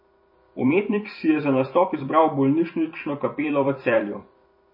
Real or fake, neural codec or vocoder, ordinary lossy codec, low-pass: fake; vocoder, 44.1 kHz, 128 mel bands every 256 samples, BigVGAN v2; MP3, 24 kbps; 5.4 kHz